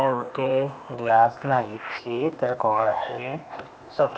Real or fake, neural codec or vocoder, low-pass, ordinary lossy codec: fake; codec, 16 kHz, 0.8 kbps, ZipCodec; none; none